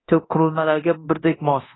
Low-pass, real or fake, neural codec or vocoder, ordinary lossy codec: 7.2 kHz; fake; codec, 24 kHz, 0.9 kbps, DualCodec; AAC, 16 kbps